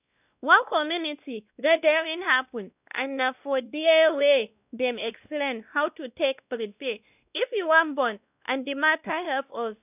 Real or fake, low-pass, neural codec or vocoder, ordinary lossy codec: fake; 3.6 kHz; codec, 16 kHz, 1 kbps, X-Codec, WavLM features, trained on Multilingual LibriSpeech; none